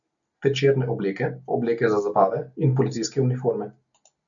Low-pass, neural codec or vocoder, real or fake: 7.2 kHz; none; real